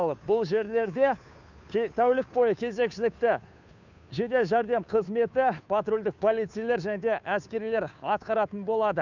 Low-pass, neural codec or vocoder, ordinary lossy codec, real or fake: 7.2 kHz; codec, 16 kHz, 2 kbps, FunCodec, trained on Chinese and English, 25 frames a second; none; fake